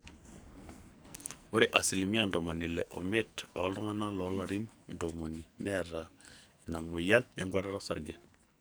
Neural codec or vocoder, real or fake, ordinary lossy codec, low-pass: codec, 44.1 kHz, 2.6 kbps, SNAC; fake; none; none